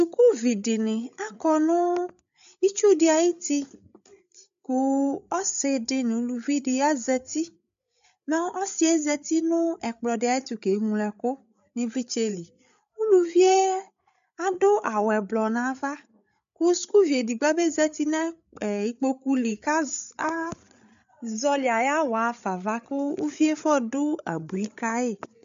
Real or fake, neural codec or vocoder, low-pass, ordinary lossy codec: fake; codec, 16 kHz, 8 kbps, FreqCodec, larger model; 7.2 kHz; MP3, 64 kbps